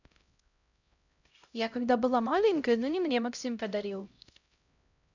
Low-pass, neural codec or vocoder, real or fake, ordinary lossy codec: 7.2 kHz; codec, 16 kHz, 0.5 kbps, X-Codec, HuBERT features, trained on LibriSpeech; fake; none